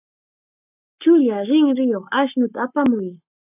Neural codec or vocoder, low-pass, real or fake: codec, 44.1 kHz, 7.8 kbps, Pupu-Codec; 3.6 kHz; fake